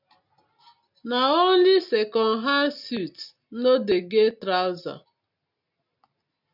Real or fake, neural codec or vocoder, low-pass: real; none; 5.4 kHz